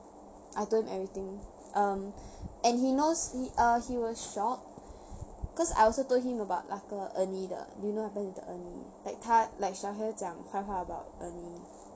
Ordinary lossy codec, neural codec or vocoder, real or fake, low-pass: none; none; real; none